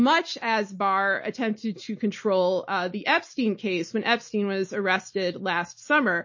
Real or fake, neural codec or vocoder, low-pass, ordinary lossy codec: real; none; 7.2 kHz; MP3, 32 kbps